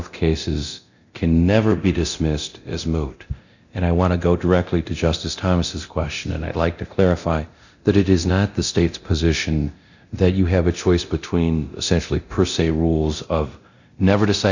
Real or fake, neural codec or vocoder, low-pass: fake; codec, 24 kHz, 0.9 kbps, DualCodec; 7.2 kHz